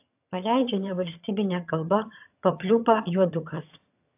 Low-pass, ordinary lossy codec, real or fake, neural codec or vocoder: 3.6 kHz; AAC, 32 kbps; fake; vocoder, 22.05 kHz, 80 mel bands, HiFi-GAN